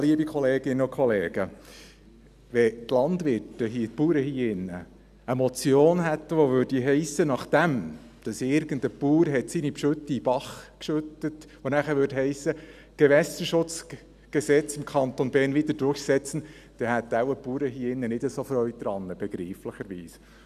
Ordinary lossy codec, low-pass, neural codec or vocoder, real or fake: none; 14.4 kHz; none; real